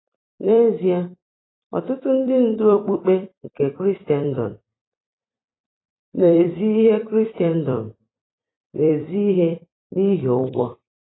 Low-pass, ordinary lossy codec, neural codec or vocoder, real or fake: 7.2 kHz; AAC, 16 kbps; vocoder, 44.1 kHz, 128 mel bands every 256 samples, BigVGAN v2; fake